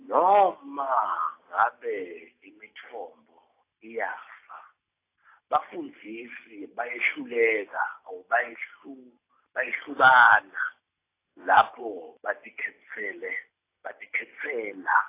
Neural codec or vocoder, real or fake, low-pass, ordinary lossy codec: none; real; 3.6 kHz; AAC, 24 kbps